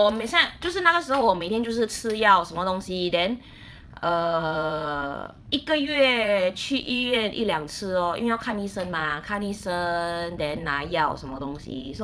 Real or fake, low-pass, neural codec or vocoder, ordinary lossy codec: fake; none; vocoder, 22.05 kHz, 80 mel bands, Vocos; none